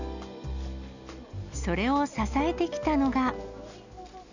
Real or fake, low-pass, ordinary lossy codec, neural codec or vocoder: real; 7.2 kHz; none; none